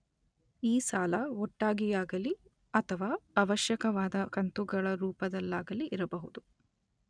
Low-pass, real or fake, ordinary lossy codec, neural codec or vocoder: 9.9 kHz; real; none; none